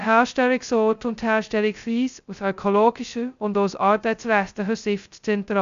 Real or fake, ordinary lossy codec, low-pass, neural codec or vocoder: fake; Opus, 64 kbps; 7.2 kHz; codec, 16 kHz, 0.2 kbps, FocalCodec